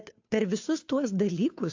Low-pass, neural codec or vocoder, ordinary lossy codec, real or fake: 7.2 kHz; codec, 16 kHz, 2 kbps, FunCodec, trained on Chinese and English, 25 frames a second; AAC, 48 kbps; fake